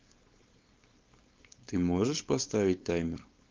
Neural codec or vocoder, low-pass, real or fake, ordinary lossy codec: codec, 16 kHz, 4.8 kbps, FACodec; 7.2 kHz; fake; Opus, 32 kbps